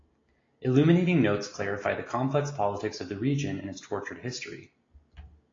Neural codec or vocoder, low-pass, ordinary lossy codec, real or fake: none; 7.2 kHz; AAC, 32 kbps; real